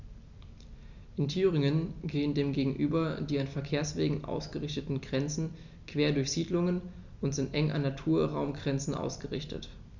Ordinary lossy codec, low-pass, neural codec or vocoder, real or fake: none; 7.2 kHz; none; real